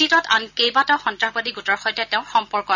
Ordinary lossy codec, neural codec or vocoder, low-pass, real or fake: none; none; 7.2 kHz; real